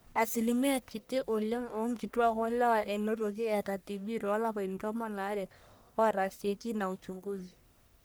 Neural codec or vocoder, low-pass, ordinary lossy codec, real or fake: codec, 44.1 kHz, 1.7 kbps, Pupu-Codec; none; none; fake